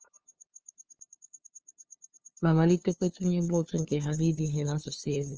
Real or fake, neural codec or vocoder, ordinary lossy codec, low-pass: fake; codec, 16 kHz, 2 kbps, FunCodec, trained on LibriTTS, 25 frames a second; Opus, 24 kbps; 7.2 kHz